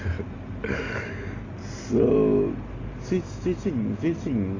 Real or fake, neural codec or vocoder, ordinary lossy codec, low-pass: real; none; AAC, 32 kbps; 7.2 kHz